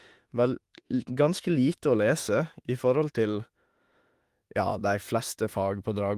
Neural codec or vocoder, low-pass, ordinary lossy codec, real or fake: autoencoder, 48 kHz, 32 numbers a frame, DAC-VAE, trained on Japanese speech; 14.4 kHz; Opus, 24 kbps; fake